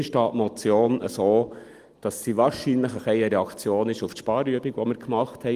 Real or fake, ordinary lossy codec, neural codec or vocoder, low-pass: real; Opus, 24 kbps; none; 14.4 kHz